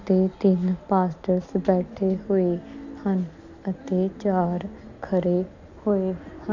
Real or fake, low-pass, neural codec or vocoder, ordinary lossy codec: real; 7.2 kHz; none; none